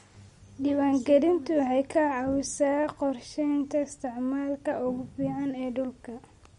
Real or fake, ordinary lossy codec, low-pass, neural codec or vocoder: real; MP3, 48 kbps; 19.8 kHz; none